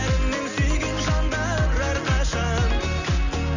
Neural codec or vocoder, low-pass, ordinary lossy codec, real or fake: none; 7.2 kHz; none; real